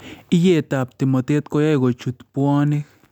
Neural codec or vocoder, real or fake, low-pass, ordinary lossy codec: none; real; 19.8 kHz; none